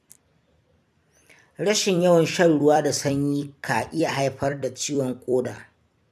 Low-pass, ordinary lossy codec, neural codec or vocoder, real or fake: 14.4 kHz; none; vocoder, 44.1 kHz, 128 mel bands every 256 samples, BigVGAN v2; fake